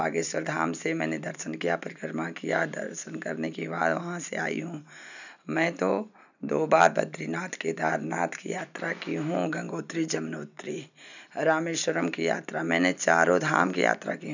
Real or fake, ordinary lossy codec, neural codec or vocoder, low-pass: real; none; none; 7.2 kHz